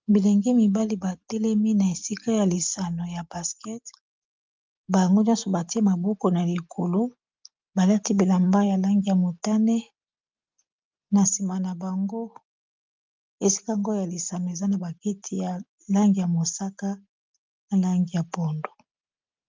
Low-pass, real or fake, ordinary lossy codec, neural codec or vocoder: 7.2 kHz; real; Opus, 24 kbps; none